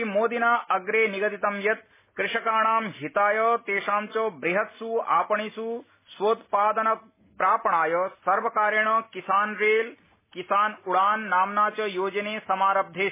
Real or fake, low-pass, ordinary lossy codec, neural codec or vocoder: real; 3.6 kHz; MP3, 16 kbps; none